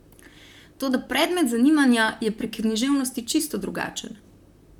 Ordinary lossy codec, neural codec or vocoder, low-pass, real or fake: none; vocoder, 44.1 kHz, 128 mel bands, Pupu-Vocoder; 19.8 kHz; fake